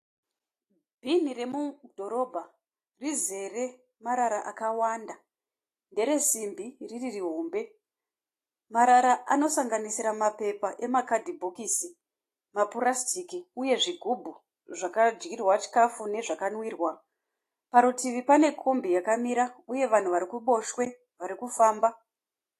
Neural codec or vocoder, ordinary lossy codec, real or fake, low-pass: none; AAC, 48 kbps; real; 14.4 kHz